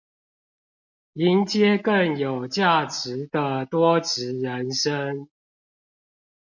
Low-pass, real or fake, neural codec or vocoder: 7.2 kHz; real; none